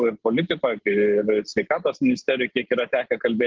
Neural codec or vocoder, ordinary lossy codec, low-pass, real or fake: none; Opus, 16 kbps; 7.2 kHz; real